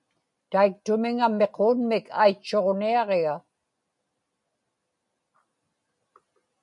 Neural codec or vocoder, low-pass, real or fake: none; 10.8 kHz; real